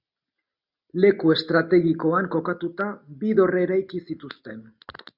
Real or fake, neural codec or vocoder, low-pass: real; none; 5.4 kHz